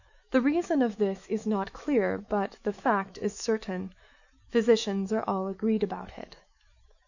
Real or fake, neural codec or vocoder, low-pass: fake; autoencoder, 48 kHz, 128 numbers a frame, DAC-VAE, trained on Japanese speech; 7.2 kHz